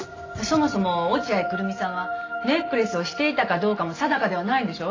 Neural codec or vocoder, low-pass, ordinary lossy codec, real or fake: none; 7.2 kHz; AAC, 32 kbps; real